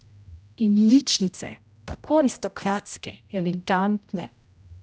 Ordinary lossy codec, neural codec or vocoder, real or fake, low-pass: none; codec, 16 kHz, 0.5 kbps, X-Codec, HuBERT features, trained on general audio; fake; none